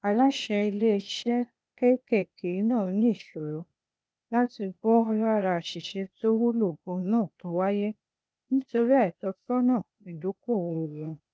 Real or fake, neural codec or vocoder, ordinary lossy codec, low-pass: fake; codec, 16 kHz, 0.8 kbps, ZipCodec; none; none